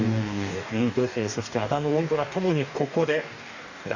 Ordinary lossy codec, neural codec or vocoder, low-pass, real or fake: none; codec, 44.1 kHz, 2.6 kbps, DAC; 7.2 kHz; fake